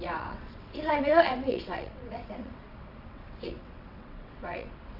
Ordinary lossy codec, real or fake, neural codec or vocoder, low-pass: none; fake; vocoder, 22.05 kHz, 80 mel bands, WaveNeXt; 5.4 kHz